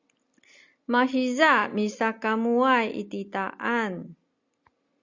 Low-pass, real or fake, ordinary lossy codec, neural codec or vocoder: 7.2 kHz; real; Opus, 64 kbps; none